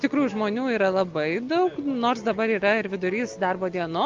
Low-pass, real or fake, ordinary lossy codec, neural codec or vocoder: 7.2 kHz; real; Opus, 32 kbps; none